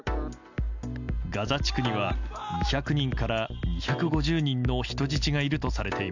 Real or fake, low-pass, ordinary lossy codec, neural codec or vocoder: real; 7.2 kHz; none; none